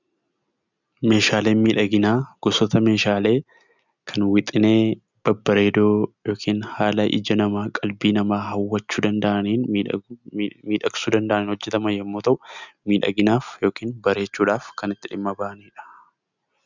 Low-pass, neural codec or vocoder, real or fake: 7.2 kHz; none; real